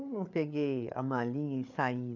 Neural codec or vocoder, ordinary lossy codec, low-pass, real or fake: codec, 16 kHz, 8 kbps, FreqCodec, larger model; none; 7.2 kHz; fake